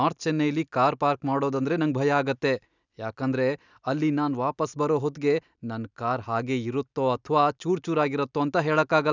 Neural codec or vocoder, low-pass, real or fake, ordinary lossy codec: none; 7.2 kHz; real; none